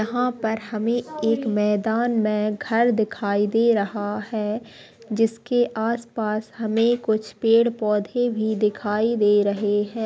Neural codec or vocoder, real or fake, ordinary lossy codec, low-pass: none; real; none; none